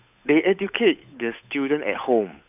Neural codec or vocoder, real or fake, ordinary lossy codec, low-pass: codec, 16 kHz, 8 kbps, FunCodec, trained on Chinese and English, 25 frames a second; fake; none; 3.6 kHz